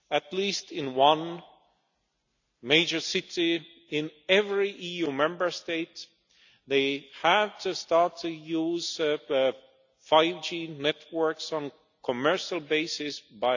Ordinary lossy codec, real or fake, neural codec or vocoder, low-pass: none; real; none; 7.2 kHz